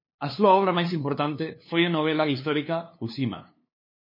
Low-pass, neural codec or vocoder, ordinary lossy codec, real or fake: 5.4 kHz; codec, 16 kHz, 2 kbps, FunCodec, trained on LibriTTS, 25 frames a second; MP3, 24 kbps; fake